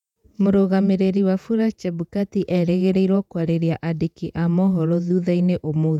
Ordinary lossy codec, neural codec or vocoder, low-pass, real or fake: none; vocoder, 48 kHz, 128 mel bands, Vocos; 19.8 kHz; fake